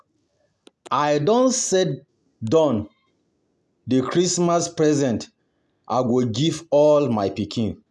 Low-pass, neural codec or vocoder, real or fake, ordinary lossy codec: none; none; real; none